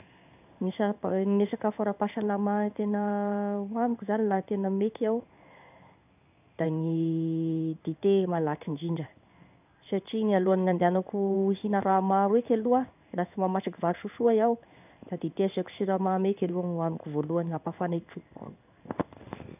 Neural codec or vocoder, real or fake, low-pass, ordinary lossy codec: codec, 16 kHz in and 24 kHz out, 1 kbps, XY-Tokenizer; fake; 3.6 kHz; none